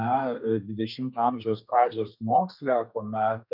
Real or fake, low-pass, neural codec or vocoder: fake; 5.4 kHz; codec, 32 kHz, 1.9 kbps, SNAC